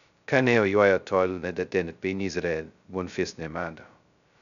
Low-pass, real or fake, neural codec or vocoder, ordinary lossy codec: 7.2 kHz; fake; codec, 16 kHz, 0.2 kbps, FocalCodec; none